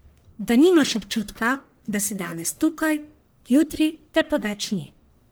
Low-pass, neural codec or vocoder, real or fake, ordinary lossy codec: none; codec, 44.1 kHz, 1.7 kbps, Pupu-Codec; fake; none